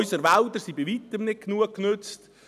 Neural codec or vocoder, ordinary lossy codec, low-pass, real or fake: none; none; 14.4 kHz; real